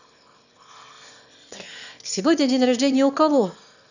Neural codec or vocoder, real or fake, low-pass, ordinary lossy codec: autoencoder, 22.05 kHz, a latent of 192 numbers a frame, VITS, trained on one speaker; fake; 7.2 kHz; none